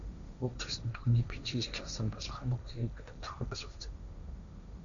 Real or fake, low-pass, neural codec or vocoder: fake; 7.2 kHz; codec, 16 kHz, 1.1 kbps, Voila-Tokenizer